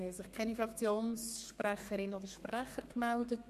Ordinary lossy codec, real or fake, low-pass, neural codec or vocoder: MP3, 64 kbps; fake; 14.4 kHz; codec, 32 kHz, 1.9 kbps, SNAC